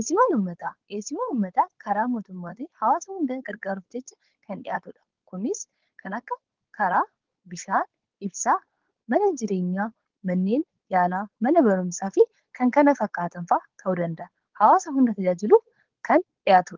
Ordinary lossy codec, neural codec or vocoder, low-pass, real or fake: Opus, 16 kbps; codec, 16 kHz, 4.8 kbps, FACodec; 7.2 kHz; fake